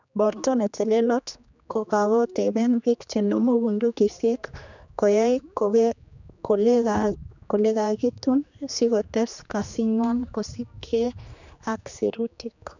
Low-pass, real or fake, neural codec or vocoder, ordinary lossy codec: 7.2 kHz; fake; codec, 16 kHz, 2 kbps, X-Codec, HuBERT features, trained on general audio; none